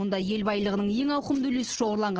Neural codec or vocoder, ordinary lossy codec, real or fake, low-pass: none; Opus, 16 kbps; real; 7.2 kHz